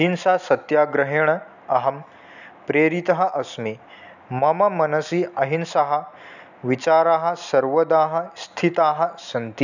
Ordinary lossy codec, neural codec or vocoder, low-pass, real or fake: none; none; 7.2 kHz; real